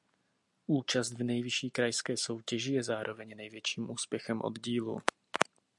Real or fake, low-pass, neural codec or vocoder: real; 10.8 kHz; none